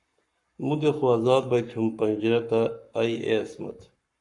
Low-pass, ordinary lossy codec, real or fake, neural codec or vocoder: 10.8 kHz; Opus, 64 kbps; fake; codec, 44.1 kHz, 7.8 kbps, Pupu-Codec